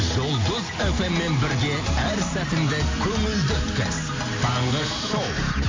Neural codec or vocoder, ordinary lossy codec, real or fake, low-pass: none; AAC, 32 kbps; real; 7.2 kHz